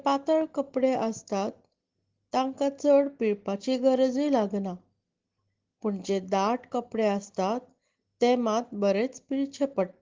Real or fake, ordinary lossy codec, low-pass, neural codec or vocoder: real; Opus, 16 kbps; 7.2 kHz; none